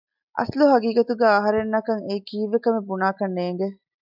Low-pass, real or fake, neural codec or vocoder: 5.4 kHz; real; none